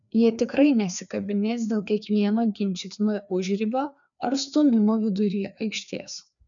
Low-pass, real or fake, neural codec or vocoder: 7.2 kHz; fake; codec, 16 kHz, 2 kbps, FreqCodec, larger model